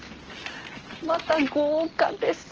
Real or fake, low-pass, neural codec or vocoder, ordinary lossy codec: real; 7.2 kHz; none; Opus, 16 kbps